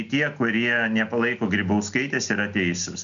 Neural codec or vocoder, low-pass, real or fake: none; 7.2 kHz; real